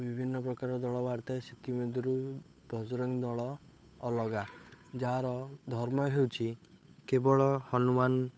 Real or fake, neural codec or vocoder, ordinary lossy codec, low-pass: fake; codec, 16 kHz, 8 kbps, FunCodec, trained on Chinese and English, 25 frames a second; none; none